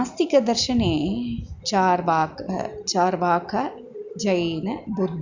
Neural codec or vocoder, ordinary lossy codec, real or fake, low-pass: none; Opus, 64 kbps; real; 7.2 kHz